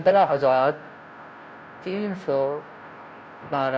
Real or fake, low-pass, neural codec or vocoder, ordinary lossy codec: fake; none; codec, 16 kHz, 0.5 kbps, FunCodec, trained on Chinese and English, 25 frames a second; none